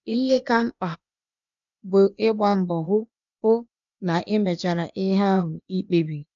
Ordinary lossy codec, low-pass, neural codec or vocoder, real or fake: none; 7.2 kHz; codec, 16 kHz, 0.8 kbps, ZipCodec; fake